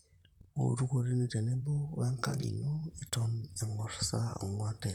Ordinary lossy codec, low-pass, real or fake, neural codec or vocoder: none; 19.8 kHz; fake; vocoder, 44.1 kHz, 128 mel bands, Pupu-Vocoder